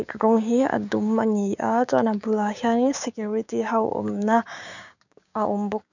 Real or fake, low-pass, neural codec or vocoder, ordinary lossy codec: fake; 7.2 kHz; codec, 16 kHz, 6 kbps, DAC; none